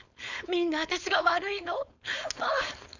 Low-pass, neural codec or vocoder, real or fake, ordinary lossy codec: 7.2 kHz; codec, 16 kHz, 4.8 kbps, FACodec; fake; none